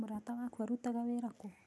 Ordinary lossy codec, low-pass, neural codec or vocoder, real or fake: none; none; none; real